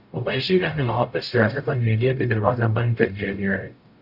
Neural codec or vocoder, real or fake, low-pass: codec, 44.1 kHz, 0.9 kbps, DAC; fake; 5.4 kHz